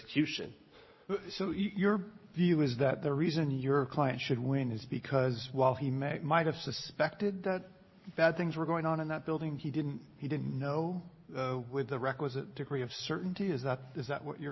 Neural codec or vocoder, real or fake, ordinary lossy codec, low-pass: none; real; MP3, 24 kbps; 7.2 kHz